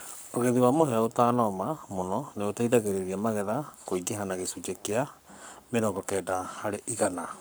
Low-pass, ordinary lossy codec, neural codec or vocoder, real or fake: none; none; codec, 44.1 kHz, 7.8 kbps, Pupu-Codec; fake